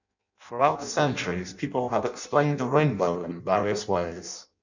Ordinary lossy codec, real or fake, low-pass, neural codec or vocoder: none; fake; 7.2 kHz; codec, 16 kHz in and 24 kHz out, 0.6 kbps, FireRedTTS-2 codec